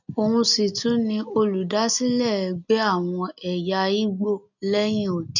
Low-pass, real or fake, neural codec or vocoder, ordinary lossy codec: 7.2 kHz; real; none; none